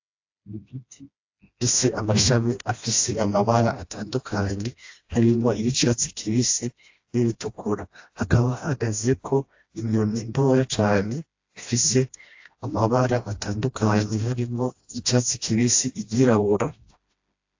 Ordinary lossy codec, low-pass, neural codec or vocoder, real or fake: AAC, 48 kbps; 7.2 kHz; codec, 16 kHz, 1 kbps, FreqCodec, smaller model; fake